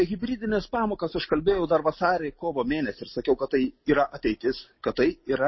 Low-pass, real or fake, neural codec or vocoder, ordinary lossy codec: 7.2 kHz; real; none; MP3, 24 kbps